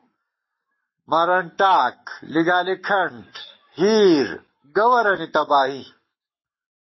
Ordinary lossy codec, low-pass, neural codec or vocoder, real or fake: MP3, 24 kbps; 7.2 kHz; codec, 44.1 kHz, 7.8 kbps, Pupu-Codec; fake